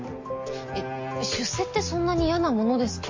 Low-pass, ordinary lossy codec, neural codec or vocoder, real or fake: 7.2 kHz; MP3, 32 kbps; none; real